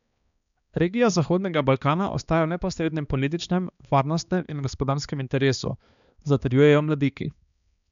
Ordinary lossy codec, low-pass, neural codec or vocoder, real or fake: none; 7.2 kHz; codec, 16 kHz, 2 kbps, X-Codec, HuBERT features, trained on balanced general audio; fake